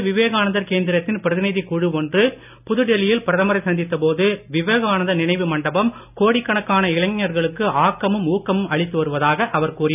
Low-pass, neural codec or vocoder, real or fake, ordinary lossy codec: 3.6 kHz; none; real; none